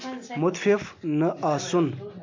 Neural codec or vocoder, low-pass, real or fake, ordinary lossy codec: none; 7.2 kHz; real; MP3, 48 kbps